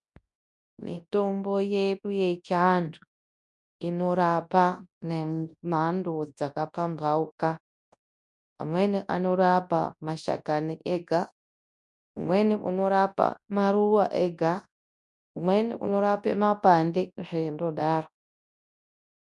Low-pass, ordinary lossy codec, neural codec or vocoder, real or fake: 10.8 kHz; MP3, 64 kbps; codec, 24 kHz, 0.9 kbps, WavTokenizer, large speech release; fake